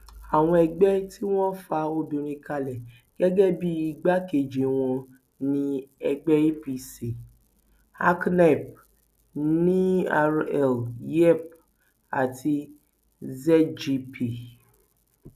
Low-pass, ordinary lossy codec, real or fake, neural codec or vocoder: 14.4 kHz; none; real; none